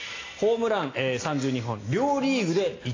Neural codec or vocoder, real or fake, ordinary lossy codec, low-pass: none; real; AAC, 32 kbps; 7.2 kHz